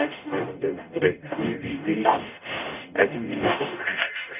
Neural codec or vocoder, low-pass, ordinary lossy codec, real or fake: codec, 44.1 kHz, 0.9 kbps, DAC; 3.6 kHz; none; fake